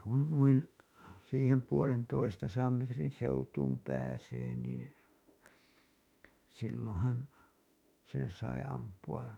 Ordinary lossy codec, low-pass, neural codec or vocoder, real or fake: none; 19.8 kHz; autoencoder, 48 kHz, 32 numbers a frame, DAC-VAE, trained on Japanese speech; fake